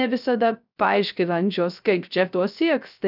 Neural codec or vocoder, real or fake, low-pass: codec, 16 kHz, 0.3 kbps, FocalCodec; fake; 5.4 kHz